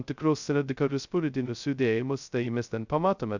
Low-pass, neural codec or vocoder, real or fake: 7.2 kHz; codec, 16 kHz, 0.2 kbps, FocalCodec; fake